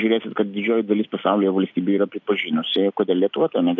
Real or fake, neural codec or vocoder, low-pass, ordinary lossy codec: real; none; 7.2 kHz; AAC, 48 kbps